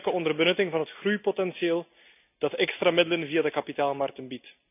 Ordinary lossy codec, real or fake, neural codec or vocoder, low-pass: none; real; none; 3.6 kHz